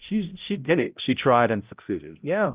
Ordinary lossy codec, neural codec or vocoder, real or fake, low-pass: Opus, 32 kbps; codec, 16 kHz, 0.5 kbps, X-Codec, HuBERT features, trained on balanced general audio; fake; 3.6 kHz